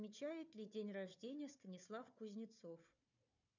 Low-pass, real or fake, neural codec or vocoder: 7.2 kHz; fake; codec, 16 kHz, 4 kbps, FunCodec, trained on Chinese and English, 50 frames a second